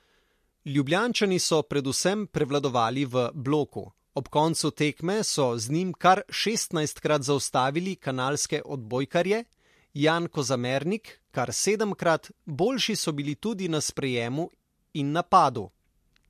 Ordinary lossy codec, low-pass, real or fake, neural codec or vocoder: MP3, 64 kbps; 14.4 kHz; real; none